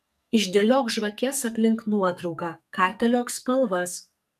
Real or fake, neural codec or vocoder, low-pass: fake; codec, 44.1 kHz, 2.6 kbps, SNAC; 14.4 kHz